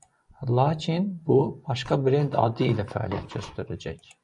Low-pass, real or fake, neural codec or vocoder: 10.8 kHz; fake; vocoder, 24 kHz, 100 mel bands, Vocos